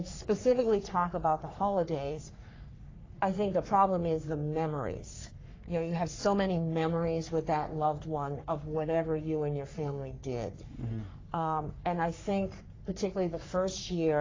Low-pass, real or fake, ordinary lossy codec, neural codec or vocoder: 7.2 kHz; fake; AAC, 32 kbps; codec, 44.1 kHz, 3.4 kbps, Pupu-Codec